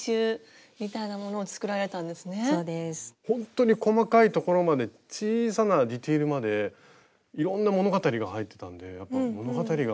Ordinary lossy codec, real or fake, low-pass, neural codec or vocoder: none; real; none; none